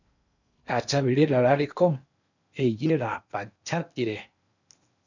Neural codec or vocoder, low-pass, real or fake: codec, 16 kHz in and 24 kHz out, 0.8 kbps, FocalCodec, streaming, 65536 codes; 7.2 kHz; fake